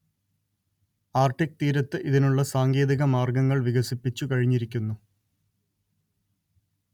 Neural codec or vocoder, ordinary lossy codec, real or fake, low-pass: none; none; real; 19.8 kHz